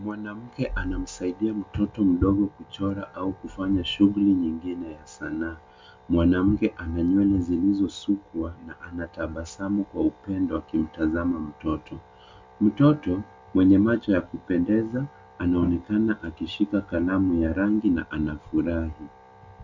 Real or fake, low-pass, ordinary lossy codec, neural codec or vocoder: real; 7.2 kHz; MP3, 64 kbps; none